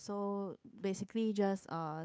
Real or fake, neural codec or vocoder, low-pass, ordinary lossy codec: fake; codec, 16 kHz, 8 kbps, FunCodec, trained on Chinese and English, 25 frames a second; none; none